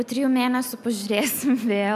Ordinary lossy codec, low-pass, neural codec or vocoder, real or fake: AAC, 96 kbps; 14.4 kHz; none; real